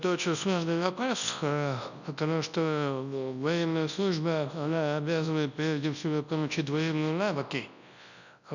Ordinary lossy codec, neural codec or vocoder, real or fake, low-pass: none; codec, 24 kHz, 0.9 kbps, WavTokenizer, large speech release; fake; 7.2 kHz